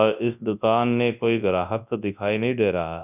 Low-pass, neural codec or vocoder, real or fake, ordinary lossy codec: 3.6 kHz; codec, 24 kHz, 0.9 kbps, WavTokenizer, large speech release; fake; none